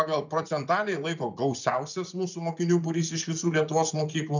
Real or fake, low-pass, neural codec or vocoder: fake; 7.2 kHz; vocoder, 24 kHz, 100 mel bands, Vocos